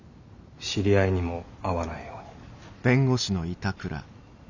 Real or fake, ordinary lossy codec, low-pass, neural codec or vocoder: real; none; 7.2 kHz; none